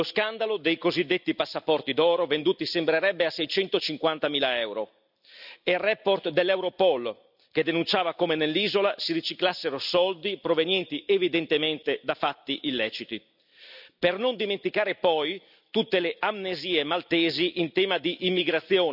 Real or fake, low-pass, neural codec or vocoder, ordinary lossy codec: real; 5.4 kHz; none; none